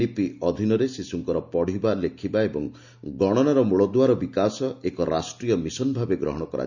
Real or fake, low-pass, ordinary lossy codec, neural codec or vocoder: real; 7.2 kHz; none; none